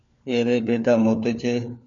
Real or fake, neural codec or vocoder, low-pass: fake; codec, 16 kHz, 4 kbps, FunCodec, trained on LibriTTS, 50 frames a second; 7.2 kHz